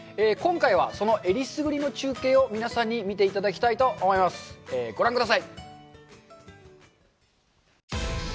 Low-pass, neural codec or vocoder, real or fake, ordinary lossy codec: none; none; real; none